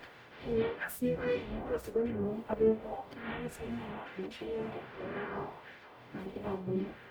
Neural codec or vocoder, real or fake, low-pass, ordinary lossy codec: codec, 44.1 kHz, 0.9 kbps, DAC; fake; none; none